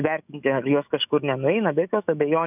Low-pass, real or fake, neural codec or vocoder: 3.6 kHz; fake; autoencoder, 48 kHz, 128 numbers a frame, DAC-VAE, trained on Japanese speech